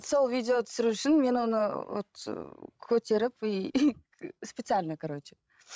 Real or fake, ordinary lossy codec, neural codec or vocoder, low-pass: fake; none; codec, 16 kHz, 16 kbps, FreqCodec, larger model; none